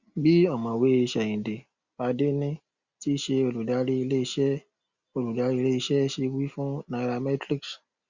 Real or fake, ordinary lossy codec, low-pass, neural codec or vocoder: real; Opus, 64 kbps; 7.2 kHz; none